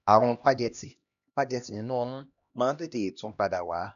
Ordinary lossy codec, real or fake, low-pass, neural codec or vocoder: none; fake; 7.2 kHz; codec, 16 kHz, 1 kbps, X-Codec, HuBERT features, trained on LibriSpeech